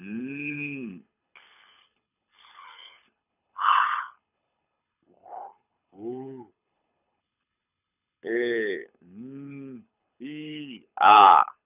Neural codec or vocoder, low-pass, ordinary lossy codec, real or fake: codec, 24 kHz, 6 kbps, HILCodec; 3.6 kHz; none; fake